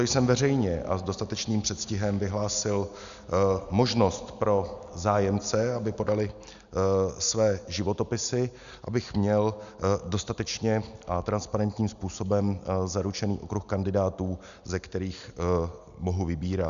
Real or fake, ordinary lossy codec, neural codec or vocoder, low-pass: real; MP3, 96 kbps; none; 7.2 kHz